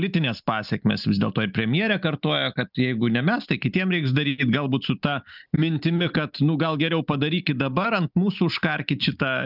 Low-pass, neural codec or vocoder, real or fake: 5.4 kHz; none; real